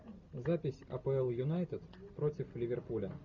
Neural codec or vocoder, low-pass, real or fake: none; 7.2 kHz; real